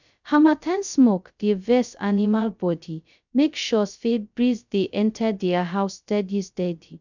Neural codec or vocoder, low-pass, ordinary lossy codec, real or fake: codec, 16 kHz, 0.2 kbps, FocalCodec; 7.2 kHz; none; fake